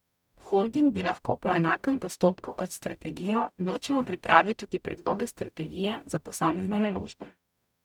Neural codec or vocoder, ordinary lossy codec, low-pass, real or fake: codec, 44.1 kHz, 0.9 kbps, DAC; none; 19.8 kHz; fake